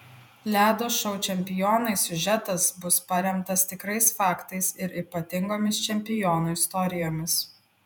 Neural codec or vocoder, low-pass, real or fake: vocoder, 48 kHz, 128 mel bands, Vocos; 19.8 kHz; fake